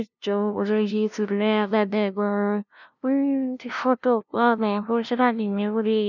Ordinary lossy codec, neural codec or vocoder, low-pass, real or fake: none; codec, 16 kHz, 0.5 kbps, FunCodec, trained on LibriTTS, 25 frames a second; 7.2 kHz; fake